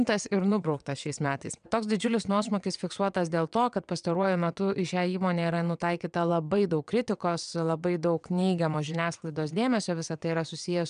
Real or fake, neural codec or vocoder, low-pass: fake; vocoder, 22.05 kHz, 80 mel bands, WaveNeXt; 9.9 kHz